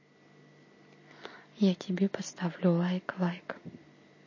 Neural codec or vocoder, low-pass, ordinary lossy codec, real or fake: none; 7.2 kHz; MP3, 32 kbps; real